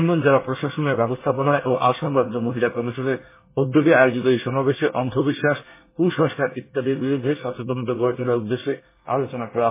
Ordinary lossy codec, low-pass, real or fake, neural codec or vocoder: MP3, 16 kbps; 3.6 kHz; fake; codec, 24 kHz, 1 kbps, SNAC